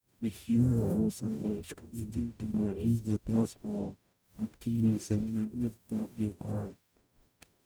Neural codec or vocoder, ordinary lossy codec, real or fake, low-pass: codec, 44.1 kHz, 0.9 kbps, DAC; none; fake; none